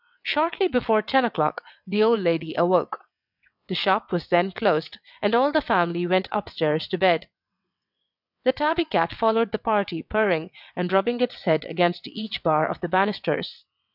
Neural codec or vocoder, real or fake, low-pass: vocoder, 22.05 kHz, 80 mel bands, WaveNeXt; fake; 5.4 kHz